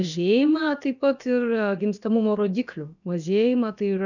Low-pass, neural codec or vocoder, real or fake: 7.2 kHz; codec, 16 kHz, about 1 kbps, DyCAST, with the encoder's durations; fake